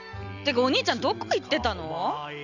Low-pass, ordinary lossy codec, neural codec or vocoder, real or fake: 7.2 kHz; none; none; real